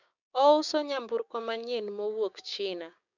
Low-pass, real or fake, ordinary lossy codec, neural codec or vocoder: 7.2 kHz; fake; none; codec, 16 kHz, 6 kbps, DAC